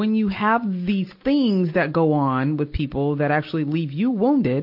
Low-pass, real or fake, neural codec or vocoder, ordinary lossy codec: 5.4 kHz; real; none; MP3, 32 kbps